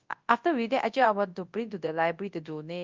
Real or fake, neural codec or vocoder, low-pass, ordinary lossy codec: fake; codec, 24 kHz, 0.9 kbps, WavTokenizer, large speech release; 7.2 kHz; Opus, 24 kbps